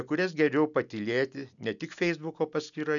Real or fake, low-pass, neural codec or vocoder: real; 7.2 kHz; none